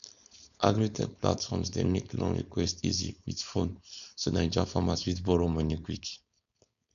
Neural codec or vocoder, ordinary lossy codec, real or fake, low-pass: codec, 16 kHz, 4.8 kbps, FACodec; none; fake; 7.2 kHz